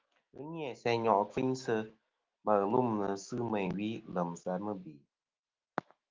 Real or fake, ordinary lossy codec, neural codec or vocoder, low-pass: fake; Opus, 24 kbps; autoencoder, 48 kHz, 128 numbers a frame, DAC-VAE, trained on Japanese speech; 7.2 kHz